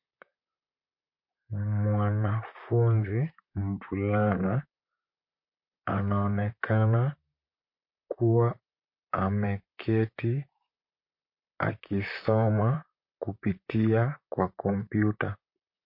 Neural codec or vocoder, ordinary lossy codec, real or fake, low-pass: vocoder, 44.1 kHz, 128 mel bands, Pupu-Vocoder; MP3, 32 kbps; fake; 5.4 kHz